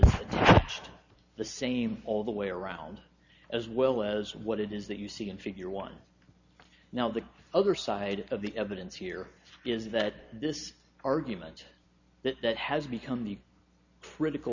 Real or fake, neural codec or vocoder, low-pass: real; none; 7.2 kHz